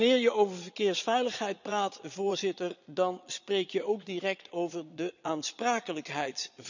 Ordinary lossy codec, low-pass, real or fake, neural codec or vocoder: none; 7.2 kHz; fake; vocoder, 22.05 kHz, 80 mel bands, Vocos